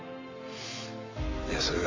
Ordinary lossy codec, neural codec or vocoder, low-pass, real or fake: MP3, 32 kbps; none; 7.2 kHz; real